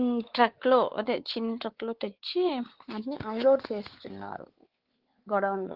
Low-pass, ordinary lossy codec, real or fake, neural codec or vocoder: 5.4 kHz; Opus, 16 kbps; fake; codec, 16 kHz, 4 kbps, X-Codec, WavLM features, trained on Multilingual LibriSpeech